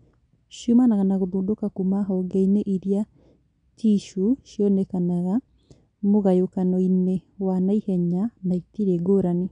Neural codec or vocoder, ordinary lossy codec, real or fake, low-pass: none; none; real; 9.9 kHz